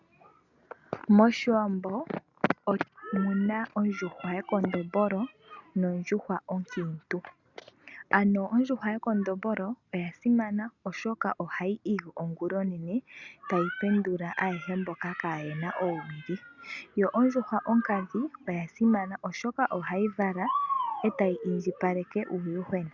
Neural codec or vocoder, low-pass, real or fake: none; 7.2 kHz; real